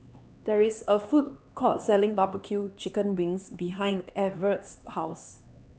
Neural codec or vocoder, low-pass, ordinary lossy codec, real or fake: codec, 16 kHz, 2 kbps, X-Codec, HuBERT features, trained on LibriSpeech; none; none; fake